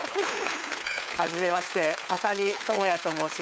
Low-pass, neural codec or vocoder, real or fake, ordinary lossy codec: none; codec, 16 kHz, 4 kbps, FunCodec, trained on LibriTTS, 50 frames a second; fake; none